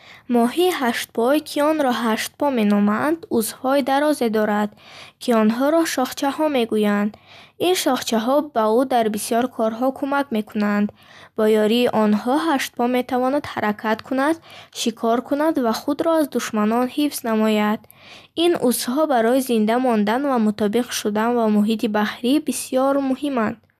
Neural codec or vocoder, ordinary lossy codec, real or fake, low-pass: none; none; real; 14.4 kHz